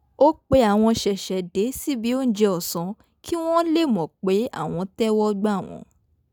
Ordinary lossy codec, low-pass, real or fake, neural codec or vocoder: none; none; real; none